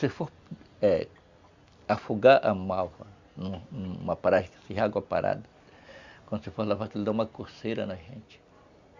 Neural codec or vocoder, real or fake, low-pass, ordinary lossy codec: none; real; 7.2 kHz; Opus, 64 kbps